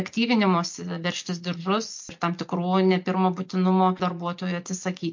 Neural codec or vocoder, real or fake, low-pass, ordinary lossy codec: none; real; 7.2 kHz; MP3, 48 kbps